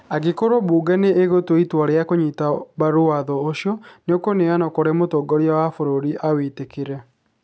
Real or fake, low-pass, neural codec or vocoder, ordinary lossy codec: real; none; none; none